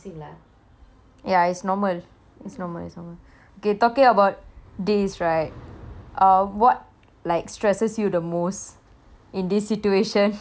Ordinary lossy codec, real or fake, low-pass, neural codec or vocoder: none; real; none; none